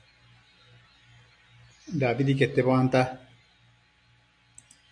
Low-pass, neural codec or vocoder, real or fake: 9.9 kHz; none; real